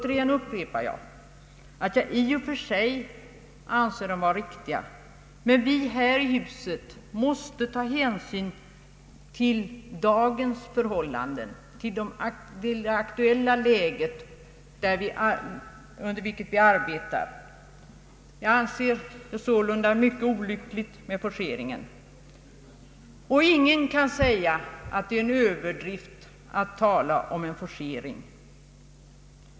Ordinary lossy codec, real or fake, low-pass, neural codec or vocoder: none; real; none; none